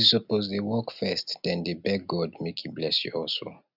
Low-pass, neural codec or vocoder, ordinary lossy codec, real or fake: 5.4 kHz; none; none; real